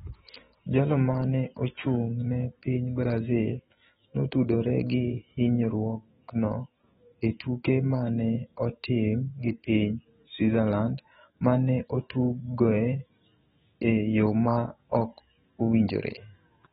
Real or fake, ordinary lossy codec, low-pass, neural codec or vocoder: fake; AAC, 16 kbps; 19.8 kHz; autoencoder, 48 kHz, 128 numbers a frame, DAC-VAE, trained on Japanese speech